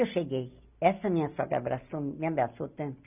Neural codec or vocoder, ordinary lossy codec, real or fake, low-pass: none; none; real; 3.6 kHz